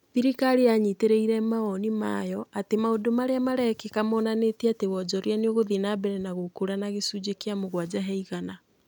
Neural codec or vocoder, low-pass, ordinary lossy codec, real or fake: none; 19.8 kHz; none; real